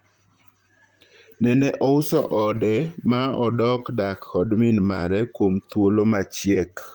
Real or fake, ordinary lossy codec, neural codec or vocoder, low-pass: fake; Opus, 32 kbps; vocoder, 44.1 kHz, 128 mel bands, Pupu-Vocoder; 19.8 kHz